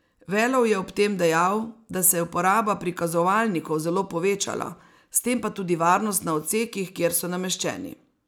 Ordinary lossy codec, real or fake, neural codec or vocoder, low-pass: none; real; none; none